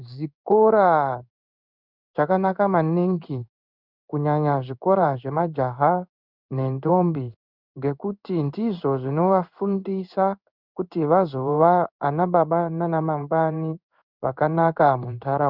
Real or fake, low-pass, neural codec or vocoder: fake; 5.4 kHz; codec, 16 kHz in and 24 kHz out, 1 kbps, XY-Tokenizer